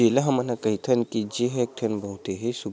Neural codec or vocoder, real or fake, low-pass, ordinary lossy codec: none; real; none; none